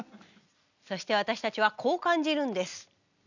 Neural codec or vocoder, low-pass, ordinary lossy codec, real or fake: none; 7.2 kHz; none; real